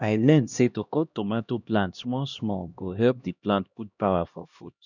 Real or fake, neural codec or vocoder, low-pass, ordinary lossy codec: fake; codec, 16 kHz, 1 kbps, X-Codec, HuBERT features, trained on LibriSpeech; 7.2 kHz; none